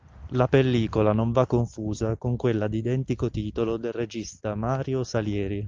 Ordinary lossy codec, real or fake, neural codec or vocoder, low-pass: Opus, 24 kbps; real; none; 7.2 kHz